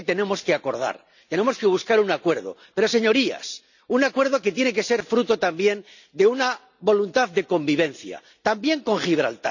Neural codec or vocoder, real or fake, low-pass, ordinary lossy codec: none; real; 7.2 kHz; none